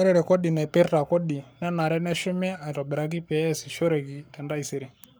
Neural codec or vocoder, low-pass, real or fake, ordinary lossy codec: codec, 44.1 kHz, 7.8 kbps, Pupu-Codec; none; fake; none